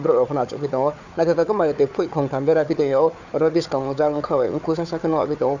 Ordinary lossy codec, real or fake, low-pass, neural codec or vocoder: none; fake; 7.2 kHz; codec, 16 kHz, 4 kbps, FunCodec, trained on Chinese and English, 50 frames a second